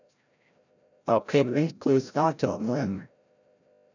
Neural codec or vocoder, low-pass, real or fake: codec, 16 kHz, 0.5 kbps, FreqCodec, larger model; 7.2 kHz; fake